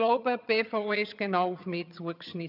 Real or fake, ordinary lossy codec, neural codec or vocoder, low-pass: fake; none; vocoder, 22.05 kHz, 80 mel bands, HiFi-GAN; 5.4 kHz